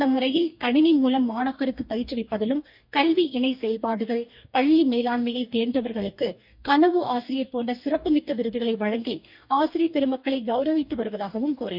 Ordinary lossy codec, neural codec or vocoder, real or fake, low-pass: none; codec, 44.1 kHz, 2.6 kbps, DAC; fake; 5.4 kHz